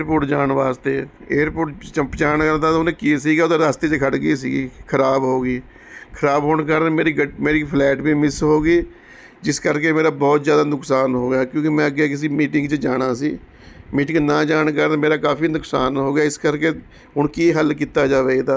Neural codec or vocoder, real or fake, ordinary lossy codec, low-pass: none; real; none; none